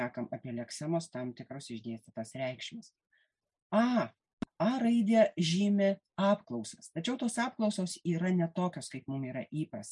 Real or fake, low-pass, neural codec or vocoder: real; 10.8 kHz; none